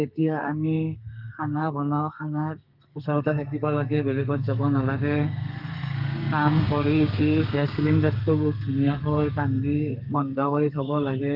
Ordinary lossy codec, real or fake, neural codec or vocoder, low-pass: Opus, 24 kbps; fake; codec, 32 kHz, 1.9 kbps, SNAC; 5.4 kHz